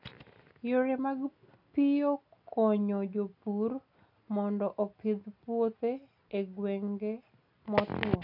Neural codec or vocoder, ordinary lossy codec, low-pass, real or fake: none; none; 5.4 kHz; real